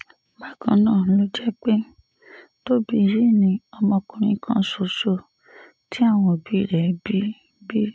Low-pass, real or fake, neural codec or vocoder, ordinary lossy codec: none; real; none; none